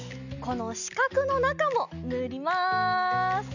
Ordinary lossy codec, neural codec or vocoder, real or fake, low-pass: none; none; real; 7.2 kHz